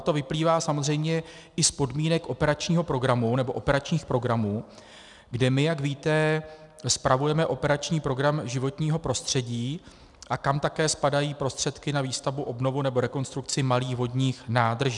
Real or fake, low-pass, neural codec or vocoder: real; 10.8 kHz; none